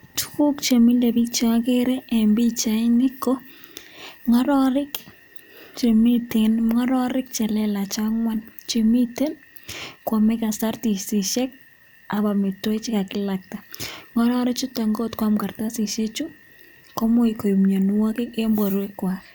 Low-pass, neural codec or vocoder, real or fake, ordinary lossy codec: none; none; real; none